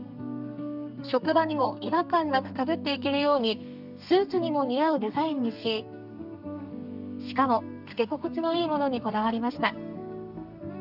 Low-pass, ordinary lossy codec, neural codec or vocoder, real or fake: 5.4 kHz; none; codec, 32 kHz, 1.9 kbps, SNAC; fake